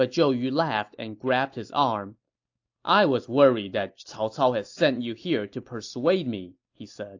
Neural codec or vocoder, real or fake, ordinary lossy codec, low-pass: none; real; AAC, 48 kbps; 7.2 kHz